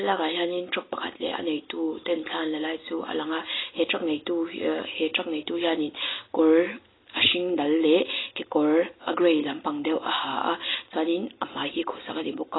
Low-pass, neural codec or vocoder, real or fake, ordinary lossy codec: 7.2 kHz; none; real; AAC, 16 kbps